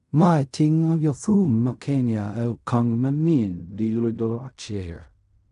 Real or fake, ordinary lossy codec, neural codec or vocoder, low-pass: fake; none; codec, 16 kHz in and 24 kHz out, 0.4 kbps, LongCat-Audio-Codec, fine tuned four codebook decoder; 10.8 kHz